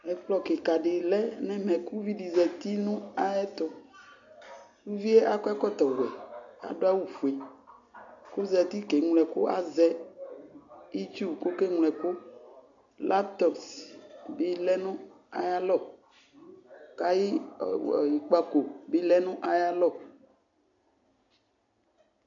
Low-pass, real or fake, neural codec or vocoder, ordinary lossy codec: 7.2 kHz; real; none; AAC, 64 kbps